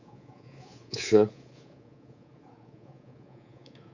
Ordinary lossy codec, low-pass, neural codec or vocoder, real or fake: Opus, 64 kbps; 7.2 kHz; codec, 24 kHz, 3.1 kbps, DualCodec; fake